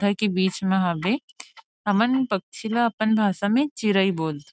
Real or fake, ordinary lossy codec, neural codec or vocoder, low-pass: real; none; none; none